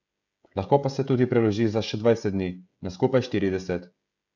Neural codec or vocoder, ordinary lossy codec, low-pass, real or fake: codec, 16 kHz, 16 kbps, FreqCodec, smaller model; none; 7.2 kHz; fake